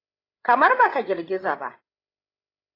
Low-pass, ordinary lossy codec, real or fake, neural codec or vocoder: 5.4 kHz; AAC, 24 kbps; fake; codec, 16 kHz, 8 kbps, FreqCodec, larger model